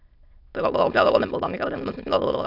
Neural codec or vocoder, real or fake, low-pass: autoencoder, 22.05 kHz, a latent of 192 numbers a frame, VITS, trained on many speakers; fake; 5.4 kHz